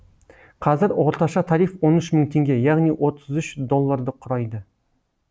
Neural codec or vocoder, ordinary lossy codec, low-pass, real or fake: none; none; none; real